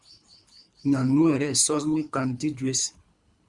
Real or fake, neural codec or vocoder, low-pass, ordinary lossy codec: fake; codec, 24 kHz, 3 kbps, HILCodec; 10.8 kHz; Opus, 64 kbps